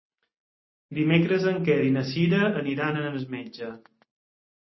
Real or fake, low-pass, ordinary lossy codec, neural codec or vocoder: real; 7.2 kHz; MP3, 24 kbps; none